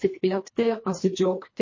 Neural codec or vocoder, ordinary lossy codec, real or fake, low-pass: codec, 24 kHz, 1.5 kbps, HILCodec; MP3, 32 kbps; fake; 7.2 kHz